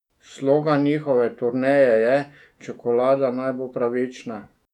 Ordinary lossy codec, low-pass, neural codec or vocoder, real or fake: none; 19.8 kHz; autoencoder, 48 kHz, 128 numbers a frame, DAC-VAE, trained on Japanese speech; fake